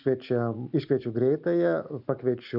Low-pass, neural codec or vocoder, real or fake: 5.4 kHz; none; real